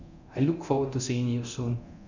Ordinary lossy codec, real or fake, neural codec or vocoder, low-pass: none; fake; codec, 24 kHz, 0.9 kbps, DualCodec; 7.2 kHz